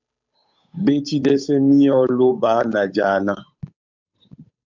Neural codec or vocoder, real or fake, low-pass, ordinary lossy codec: codec, 16 kHz, 8 kbps, FunCodec, trained on Chinese and English, 25 frames a second; fake; 7.2 kHz; MP3, 64 kbps